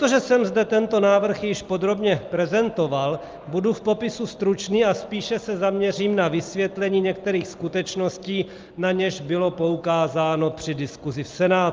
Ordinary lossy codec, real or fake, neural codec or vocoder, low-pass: Opus, 32 kbps; real; none; 7.2 kHz